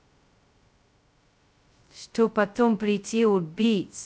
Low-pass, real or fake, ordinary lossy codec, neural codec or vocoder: none; fake; none; codec, 16 kHz, 0.2 kbps, FocalCodec